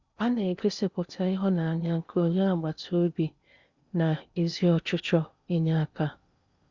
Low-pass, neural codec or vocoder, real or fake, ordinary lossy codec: 7.2 kHz; codec, 16 kHz in and 24 kHz out, 0.8 kbps, FocalCodec, streaming, 65536 codes; fake; Opus, 64 kbps